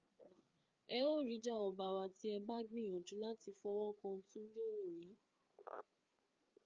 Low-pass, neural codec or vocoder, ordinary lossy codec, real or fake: 7.2 kHz; codec, 16 kHz, 4 kbps, FreqCodec, larger model; Opus, 24 kbps; fake